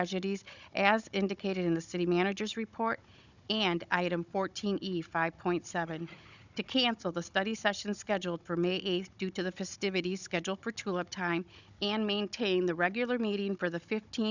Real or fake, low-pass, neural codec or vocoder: fake; 7.2 kHz; codec, 16 kHz, 16 kbps, FunCodec, trained on Chinese and English, 50 frames a second